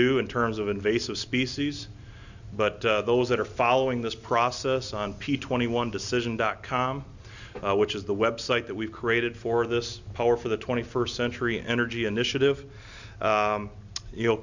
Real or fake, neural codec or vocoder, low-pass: real; none; 7.2 kHz